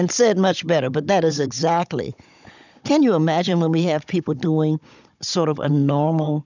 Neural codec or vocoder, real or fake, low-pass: codec, 16 kHz, 16 kbps, FunCodec, trained on Chinese and English, 50 frames a second; fake; 7.2 kHz